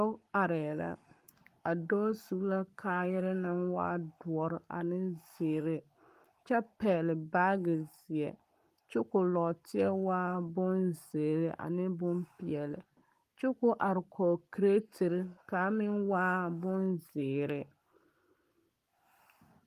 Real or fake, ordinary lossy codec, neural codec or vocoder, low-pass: fake; Opus, 32 kbps; codec, 44.1 kHz, 7.8 kbps, Pupu-Codec; 14.4 kHz